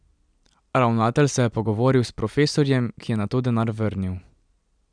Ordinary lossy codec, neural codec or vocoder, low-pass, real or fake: none; none; 9.9 kHz; real